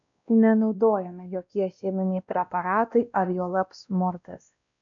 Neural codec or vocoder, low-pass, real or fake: codec, 16 kHz, 1 kbps, X-Codec, WavLM features, trained on Multilingual LibriSpeech; 7.2 kHz; fake